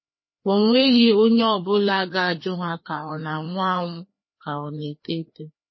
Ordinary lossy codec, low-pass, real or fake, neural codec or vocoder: MP3, 24 kbps; 7.2 kHz; fake; codec, 16 kHz, 2 kbps, FreqCodec, larger model